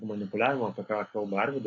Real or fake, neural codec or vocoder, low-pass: real; none; 7.2 kHz